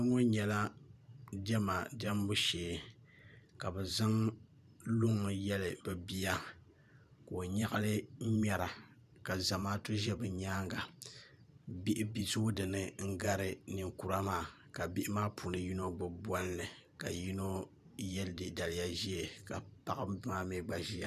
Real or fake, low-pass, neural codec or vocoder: fake; 14.4 kHz; vocoder, 44.1 kHz, 128 mel bands every 512 samples, BigVGAN v2